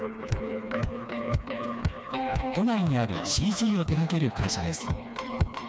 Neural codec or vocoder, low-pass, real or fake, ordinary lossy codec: codec, 16 kHz, 2 kbps, FreqCodec, smaller model; none; fake; none